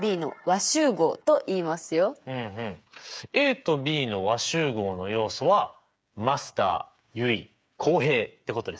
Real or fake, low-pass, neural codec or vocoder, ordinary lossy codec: fake; none; codec, 16 kHz, 8 kbps, FreqCodec, smaller model; none